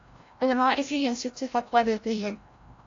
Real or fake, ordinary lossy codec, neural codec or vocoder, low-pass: fake; AAC, 48 kbps; codec, 16 kHz, 0.5 kbps, FreqCodec, larger model; 7.2 kHz